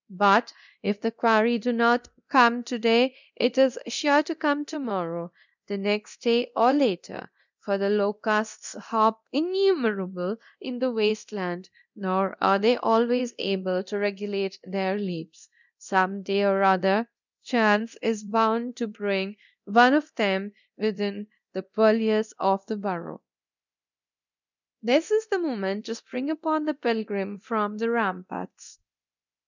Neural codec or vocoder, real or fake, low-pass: codec, 24 kHz, 0.9 kbps, DualCodec; fake; 7.2 kHz